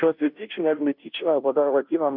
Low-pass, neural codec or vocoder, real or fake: 5.4 kHz; codec, 16 kHz, 0.5 kbps, FunCodec, trained on Chinese and English, 25 frames a second; fake